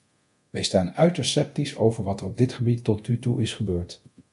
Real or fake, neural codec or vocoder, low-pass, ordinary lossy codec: fake; codec, 24 kHz, 0.9 kbps, DualCodec; 10.8 kHz; MP3, 64 kbps